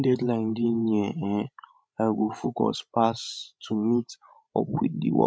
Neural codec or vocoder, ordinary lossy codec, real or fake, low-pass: codec, 16 kHz, 16 kbps, FreqCodec, larger model; none; fake; none